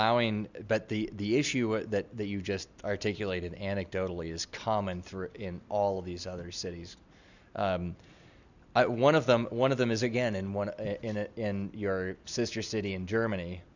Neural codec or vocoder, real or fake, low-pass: none; real; 7.2 kHz